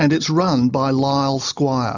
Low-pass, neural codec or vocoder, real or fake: 7.2 kHz; none; real